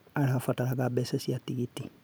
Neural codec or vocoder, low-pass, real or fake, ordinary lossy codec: vocoder, 44.1 kHz, 128 mel bands every 512 samples, BigVGAN v2; none; fake; none